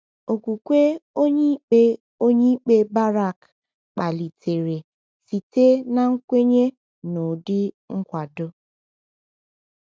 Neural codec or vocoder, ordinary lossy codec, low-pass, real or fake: none; none; none; real